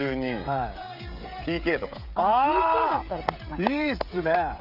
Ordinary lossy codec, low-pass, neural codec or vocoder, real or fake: none; 5.4 kHz; codec, 16 kHz, 16 kbps, FreqCodec, smaller model; fake